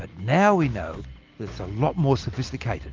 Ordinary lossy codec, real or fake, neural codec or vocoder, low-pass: Opus, 32 kbps; real; none; 7.2 kHz